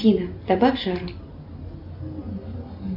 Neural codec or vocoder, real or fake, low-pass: none; real; 5.4 kHz